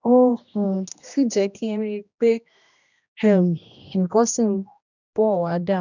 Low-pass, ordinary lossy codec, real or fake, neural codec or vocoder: 7.2 kHz; none; fake; codec, 16 kHz, 1 kbps, X-Codec, HuBERT features, trained on general audio